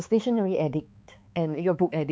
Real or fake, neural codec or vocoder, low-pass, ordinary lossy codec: fake; codec, 16 kHz, 4 kbps, X-Codec, HuBERT features, trained on balanced general audio; none; none